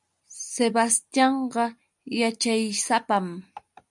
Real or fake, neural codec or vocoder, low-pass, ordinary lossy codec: real; none; 10.8 kHz; MP3, 96 kbps